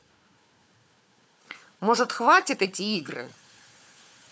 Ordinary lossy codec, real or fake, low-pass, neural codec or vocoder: none; fake; none; codec, 16 kHz, 4 kbps, FunCodec, trained on Chinese and English, 50 frames a second